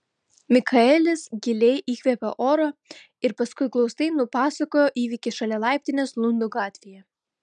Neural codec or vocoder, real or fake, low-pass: none; real; 9.9 kHz